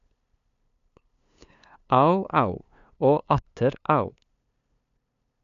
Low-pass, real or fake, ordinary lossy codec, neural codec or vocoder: 7.2 kHz; fake; none; codec, 16 kHz, 2 kbps, FunCodec, trained on LibriTTS, 25 frames a second